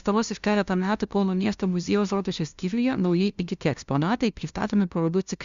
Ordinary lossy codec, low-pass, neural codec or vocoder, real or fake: Opus, 64 kbps; 7.2 kHz; codec, 16 kHz, 0.5 kbps, FunCodec, trained on LibriTTS, 25 frames a second; fake